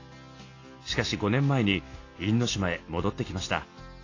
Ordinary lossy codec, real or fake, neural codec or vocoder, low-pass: AAC, 32 kbps; real; none; 7.2 kHz